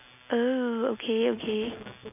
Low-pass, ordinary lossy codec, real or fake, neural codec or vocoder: 3.6 kHz; none; real; none